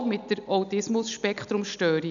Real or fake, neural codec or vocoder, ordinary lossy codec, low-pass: real; none; none; 7.2 kHz